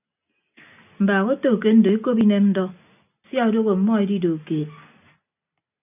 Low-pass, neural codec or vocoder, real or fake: 3.6 kHz; none; real